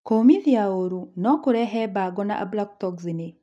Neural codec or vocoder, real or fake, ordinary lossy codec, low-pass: none; real; none; none